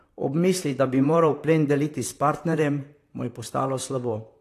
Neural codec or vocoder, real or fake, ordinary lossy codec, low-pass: vocoder, 44.1 kHz, 128 mel bands, Pupu-Vocoder; fake; AAC, 48 kbps; 14.4 kHz